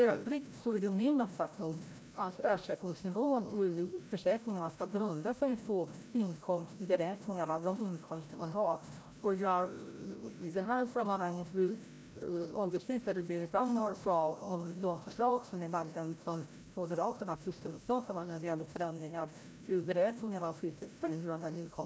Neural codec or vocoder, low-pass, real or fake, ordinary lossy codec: codec, 16 kHz, 0.5 kbps, FreqCodec, larger model; none; fake; none